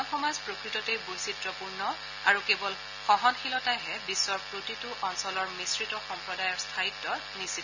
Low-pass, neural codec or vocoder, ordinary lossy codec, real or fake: 7.2 kHz; none; none; real